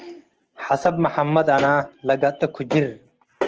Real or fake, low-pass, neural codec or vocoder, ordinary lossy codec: real; 7.2 kHz; none; Opus, 16 kbps